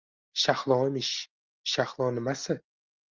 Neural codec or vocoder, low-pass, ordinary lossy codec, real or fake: none; 7.2 kHz; Opus, 24 kbps; real